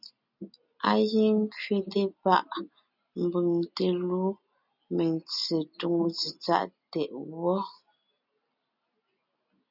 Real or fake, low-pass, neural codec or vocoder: real; 5.4 kHz; none